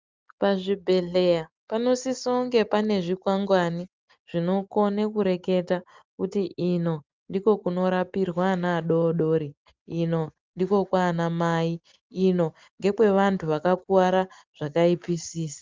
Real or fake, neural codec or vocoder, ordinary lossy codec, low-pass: real; none; Opus, 32 kbps; 7.2 kHz